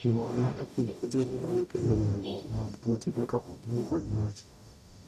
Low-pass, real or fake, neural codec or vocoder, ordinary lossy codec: 14.4 kHz; fake; codec, 44.1 kHz, 0.9 kbps, DAC; none